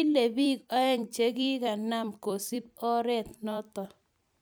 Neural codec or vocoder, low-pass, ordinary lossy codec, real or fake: vocoder, 44.1 kHz, 128 mel bands every 256 samples, BigVGAN v2; none; none; fake